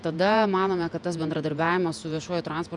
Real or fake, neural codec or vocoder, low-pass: fake; vocoder, 48 kHz, 128 mel bands, Vocos; 10.8 kHz